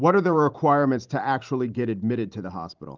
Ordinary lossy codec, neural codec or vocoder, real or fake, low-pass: Opus, 24 kbps; vocoder, 44.1 kHz, 128 mel bands every 512 samples, BigVGAN v2; fake; 7.2 kHz